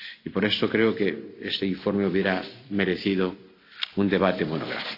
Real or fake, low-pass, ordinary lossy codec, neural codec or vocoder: real; 5.4 kHz; AAC, 32 kbps; none